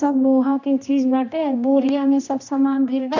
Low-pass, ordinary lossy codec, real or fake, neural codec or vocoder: 7.2 kHz; none; fake; codec, 16 kHz, 1 kbps, X-Codec, HuBERT features, trained on general audio